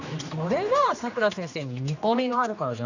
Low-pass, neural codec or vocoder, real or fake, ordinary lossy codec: 7.2 kHz; codec, 16 kHz, 2 kbps, X-Codec, HuBERT features, trained on general audio; fake; none